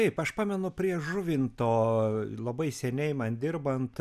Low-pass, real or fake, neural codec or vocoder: 14.4 kHz; real; none